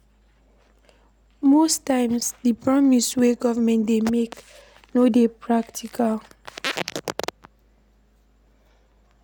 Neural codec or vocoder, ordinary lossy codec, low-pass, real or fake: none; none; none; real